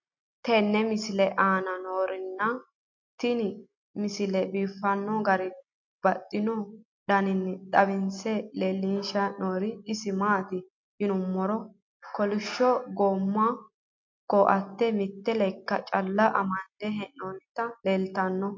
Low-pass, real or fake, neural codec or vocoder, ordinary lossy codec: 7.2 kHz; real; none; MP3, 48 kbps